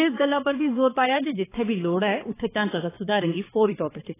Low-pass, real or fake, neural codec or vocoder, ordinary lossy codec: 3.6 kHz; fake; codec, 16 kHz, 4 kbps, X-Codec, HuBERT features, trained on balanced general audio; AAC, 16 kbps